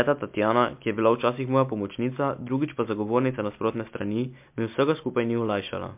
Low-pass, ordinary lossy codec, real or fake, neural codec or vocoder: 3.6 kHz; MP3, 32 kbps; real; none